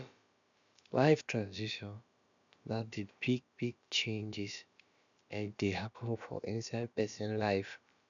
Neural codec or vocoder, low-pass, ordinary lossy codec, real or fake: codec, 16 kHz, about 1 kbps, DyCAST, with the encoder's durations; 7.2 kHz; none; fake